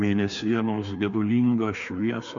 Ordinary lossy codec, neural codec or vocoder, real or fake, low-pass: MP3, 48 kbps; codec, 16 kHz, 2 kbps, FreqCodec, larger model; fake; 7.2 kHz